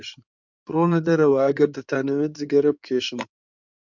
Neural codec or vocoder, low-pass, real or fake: codec, 16 kHz in and 24 kHz out, 2.2 kbps, FireRedTTS-2 codec; 7.2 kHz; fake